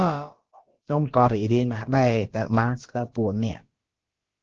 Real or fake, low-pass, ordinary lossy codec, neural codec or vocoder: fake; 7.2 kHz; Opus, 16 kbps; codec, 16 kHz, about 1 kbps, DyCAST, with the encoder's durations